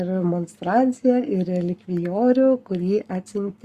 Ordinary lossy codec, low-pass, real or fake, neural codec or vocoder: Opus, 64 kbps; 14.4 kHz; fake; codec, 44.1 kHz, 7.8 kbps, Pupu-Codec